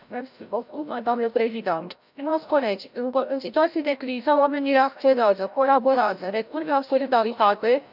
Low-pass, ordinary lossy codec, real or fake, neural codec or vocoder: 5.4 kHz; none; fake; codec, 16 kHz, 0.5 kbps, FreqCodec, larger model